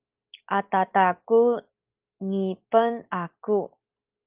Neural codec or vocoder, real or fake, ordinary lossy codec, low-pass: none; real; Opus, 24 kbps; 3.6 kHz